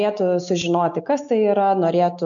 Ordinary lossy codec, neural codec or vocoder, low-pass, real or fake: MP3, 96 kbps; none; 7.2 kHz; real